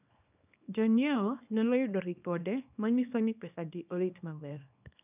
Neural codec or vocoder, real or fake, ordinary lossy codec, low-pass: codec, 24 kHz, 0.9 kbps, WavTokenizer, small release; fake; none; 3.6 kHz